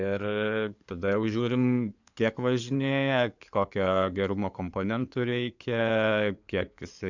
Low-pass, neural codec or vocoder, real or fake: 7.2 kHz; codec, 16 kHz in and 24 kHz out, 2.2 kbps, FireRedTTS-2 codec; fake